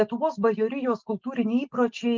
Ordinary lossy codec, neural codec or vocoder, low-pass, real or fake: Opus, 32 kbps; none; 7.2 kHz; real